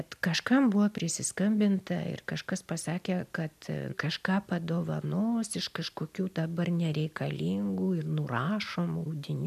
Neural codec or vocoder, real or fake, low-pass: none; real; 14.4 kHz